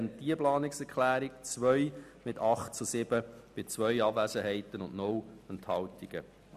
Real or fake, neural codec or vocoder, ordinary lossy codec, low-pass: real; none; none; 14.4 kHz